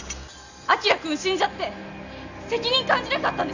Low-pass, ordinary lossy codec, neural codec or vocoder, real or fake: 7.2 kHz; none; none; real